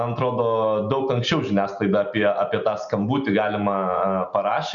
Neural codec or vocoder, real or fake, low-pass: none; real; 7.2 kHz